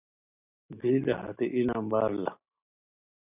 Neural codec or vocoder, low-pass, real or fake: none; 3.6 kHz; real